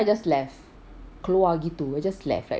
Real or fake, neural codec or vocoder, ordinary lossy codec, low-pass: real; none; none; none